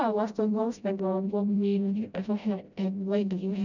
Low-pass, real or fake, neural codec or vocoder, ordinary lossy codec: 7.2 kHz; fake; codec, 16 kHz, 0.5 kbps, FreqCodec, smaller model; none